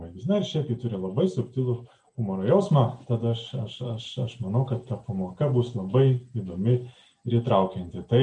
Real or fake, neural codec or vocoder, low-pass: real; none; 9.9 kHz